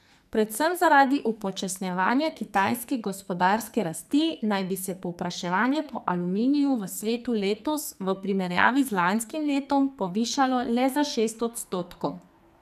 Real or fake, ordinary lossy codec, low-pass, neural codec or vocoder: fake; none; 14.4 kHz; codec, 44.1 kHz, 2.6 kbps, SNAC